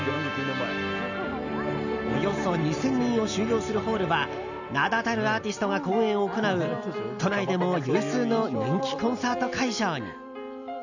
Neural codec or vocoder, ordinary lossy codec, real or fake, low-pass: none; none; real; 7.2 kHz